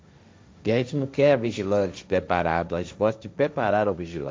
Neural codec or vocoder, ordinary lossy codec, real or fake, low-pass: codec, 16 kHz, 1.1 kbps, Voila-Tokenizer; none; fake; none